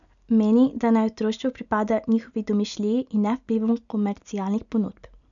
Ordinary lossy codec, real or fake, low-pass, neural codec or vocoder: none; real; 7.2 kHz; none